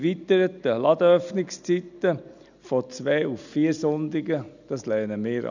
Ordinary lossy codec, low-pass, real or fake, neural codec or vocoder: none; 7.2 kHz; real; none